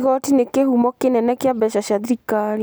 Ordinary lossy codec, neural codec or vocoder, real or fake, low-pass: none; none; real; none